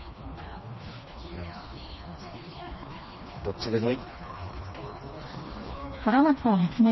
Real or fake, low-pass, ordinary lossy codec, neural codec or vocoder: fake; 7.2 kHz; MP3, 24 kbps; codec, 16 kHz, 2 kbps, FreqCodec, smaller model